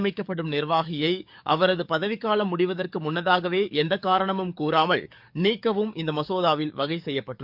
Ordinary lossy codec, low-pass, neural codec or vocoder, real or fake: none; 5.4 kHz; codec, 44.1 kHz, 7.8 kbps, DAC; fake